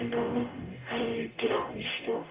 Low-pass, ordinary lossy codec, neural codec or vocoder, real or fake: 3.6 kHz; Opus, 32 kbps; codec, 44.1 kHz, 0.9 kbps, DAC; fake